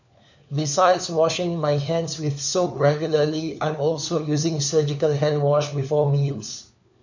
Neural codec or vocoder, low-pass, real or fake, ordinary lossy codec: codec, 16 kHz, 4 kbps, FunCodec, trained on LibriTTS, 50 frames a second; 7.2 kHz; fake; none